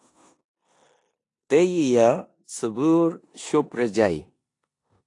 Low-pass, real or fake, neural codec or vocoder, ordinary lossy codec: 10.8 kHz; fake; codec, 16 kHz in and 24 kHz out, 0.9 kbps, LongCat-Audio-Codec, four codebook decoder; AAC, 48 kbps